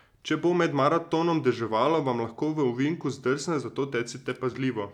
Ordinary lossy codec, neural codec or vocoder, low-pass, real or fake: none; none; 19.8 kHz; real